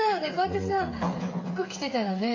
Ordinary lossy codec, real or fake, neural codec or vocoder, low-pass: AAC, 32 kbps; fake; codec, 16 kHz, 8 kbps, FreqCodec, smaller model; 7.2 kHz